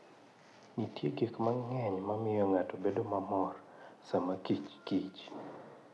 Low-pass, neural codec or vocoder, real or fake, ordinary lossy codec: none; none; real; none